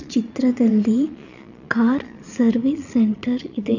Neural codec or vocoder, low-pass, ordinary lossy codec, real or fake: vocoder, 22.05 kHz, 80 mel bands, Vocos; 7.2 kHz; none; fake